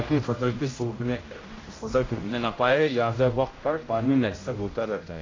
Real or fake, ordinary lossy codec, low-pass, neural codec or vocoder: fake; AAC, 32 kbps; 7.2 kHz; codec, 16 kHz, 0.5 kbps, X-Codec, HuBERT features, trained on general audio